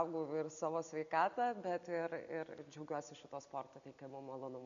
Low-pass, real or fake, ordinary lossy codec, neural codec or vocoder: 7.2 kHz; real; MP3, 96 kbps; none